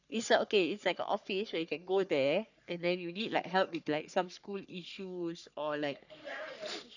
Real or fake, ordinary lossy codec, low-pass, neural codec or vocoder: fake; none; 7.2 kHz; codec, 44.1 kHz, 3.4 kbps, Pupu-Codec